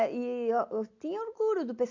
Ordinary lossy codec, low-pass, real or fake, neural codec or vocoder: AAC, 48 kbps; 7.2 kHz; real; none